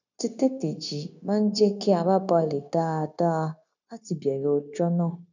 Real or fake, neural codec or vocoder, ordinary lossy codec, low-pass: fake; codec, 16 kHz, 0.9 kbps, LongCat-Audio-Codec; none; 7.2 kHz